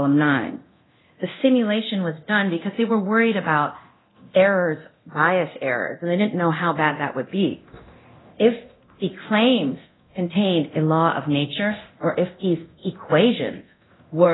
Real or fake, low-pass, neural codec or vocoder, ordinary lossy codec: fake; 7.2 kHz; codec, 24 kHz, 0.9 kbps, DualCodec; AAC, 16 kbps